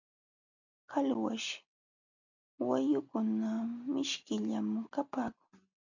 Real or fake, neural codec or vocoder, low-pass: real; none; 7.2 kHz